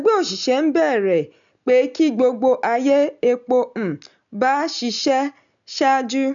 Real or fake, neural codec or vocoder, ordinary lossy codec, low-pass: real; none; none; 7.2 kHz